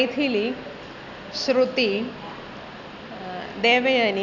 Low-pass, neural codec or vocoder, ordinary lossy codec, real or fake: 7.2 kHz; none; none; real